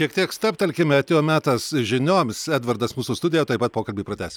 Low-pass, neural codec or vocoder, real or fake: 19.8 kHz; none; real